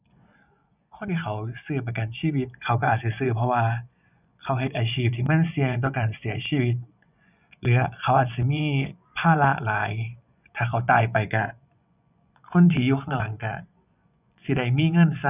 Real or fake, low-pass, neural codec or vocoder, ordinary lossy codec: fake; 3.6 kHz; vocoder, 24 kHz, 100 mel bands, Vocos; none